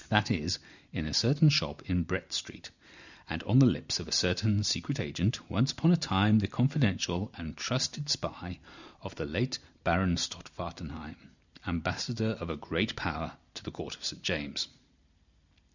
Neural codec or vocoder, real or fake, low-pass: none; real; 7.2 kHz